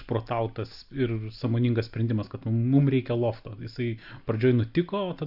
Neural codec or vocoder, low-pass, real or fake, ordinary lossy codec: none; 5.4 kHz; real; AAC, 48 kbps